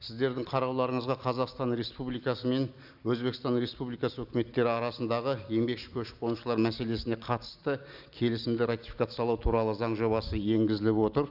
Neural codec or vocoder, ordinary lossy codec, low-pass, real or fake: none; none; 5.4 kHz; real